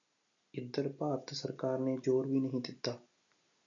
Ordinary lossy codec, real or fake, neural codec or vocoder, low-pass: MP3, 96 kbps; real; none; 7.2 kHz